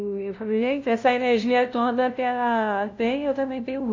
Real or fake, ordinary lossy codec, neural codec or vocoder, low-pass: fake; AAC, 48 kbps; codec, 16 kHz, 0.5 kbps, FunCodec, trained on LibriTTS, 25 frames a second; 7.2 kHz